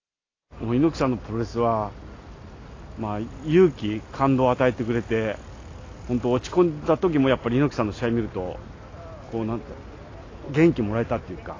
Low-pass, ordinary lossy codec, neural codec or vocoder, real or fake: 7.2 kHz; AAC, 32 kbps; none; real